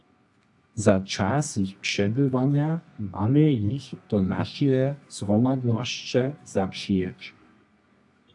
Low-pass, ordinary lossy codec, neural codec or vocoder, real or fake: 10.8 kHz; AAC, 64 kbps; codec, 24 kHz, 0.9 kbps, WavTokenizer, medium music audio release; fake